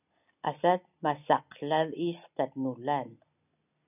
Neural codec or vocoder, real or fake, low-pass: none; real; 3.6 kHz